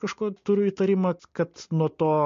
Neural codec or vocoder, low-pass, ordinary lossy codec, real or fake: none; 7.2 kHz; MP3, 48 kbps; real